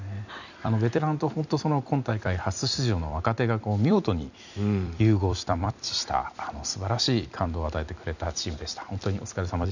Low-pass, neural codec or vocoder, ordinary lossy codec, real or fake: 7.2 kHz; vocoder, 44.1 kHz, 128 mel bands every 256 samples, BigVGAN v2; none; fake